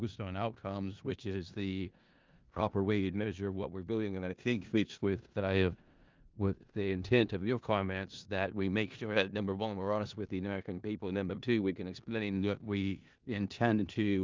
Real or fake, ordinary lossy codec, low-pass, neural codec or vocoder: fake; Opus, 24 kbps; 7.2 kHz; codec, 16 kHz in and 24 kHz out, 0.4 kbps, LongCat-Audio-Codec, four codebook decoder